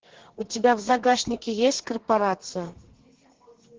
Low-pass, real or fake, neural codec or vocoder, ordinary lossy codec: 7.2 kHz; fake; codec, 32 kHz, 1.9 kbps, SNAC; Opus, 16 kbps